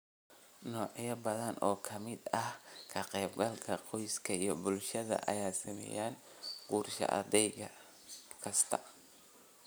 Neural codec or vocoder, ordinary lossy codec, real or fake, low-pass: none; none; real; none